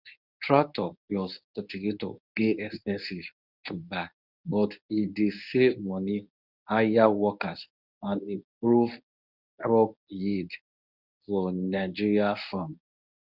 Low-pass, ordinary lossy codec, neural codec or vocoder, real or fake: 5.4 kHz; none; codec, 24 kHz, 0.9 kbps, WavTokenizer, medium speech release version 1; fake